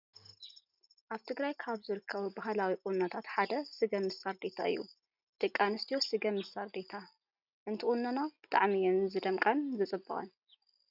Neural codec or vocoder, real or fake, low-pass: none; real; 5.4 kHz